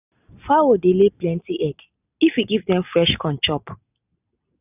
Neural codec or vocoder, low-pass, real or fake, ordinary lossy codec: none; 3.6 kHz; real; none